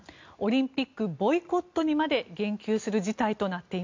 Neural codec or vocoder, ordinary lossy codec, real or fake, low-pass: none; MP3, 64 kbps; real; 7.2 kHz